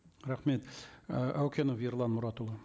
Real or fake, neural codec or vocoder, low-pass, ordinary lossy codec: real; none; none; none